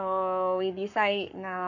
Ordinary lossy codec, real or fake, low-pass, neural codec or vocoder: none; fake; 7.2 kHz; codec, 44.1 kHz, 7.8 kbps, Pupu-Codec